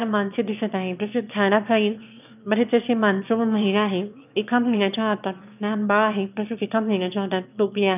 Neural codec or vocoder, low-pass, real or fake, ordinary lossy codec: autoencoder, 22.05 kHz, a latent of 192 numbers a frame, VITS, trained on one speaker; 3.6 kHz; fake; none